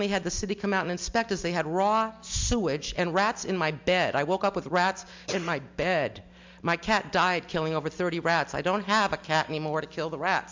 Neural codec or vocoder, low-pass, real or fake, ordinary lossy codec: none; 7.2 kHz; real; MP3, 64 kbps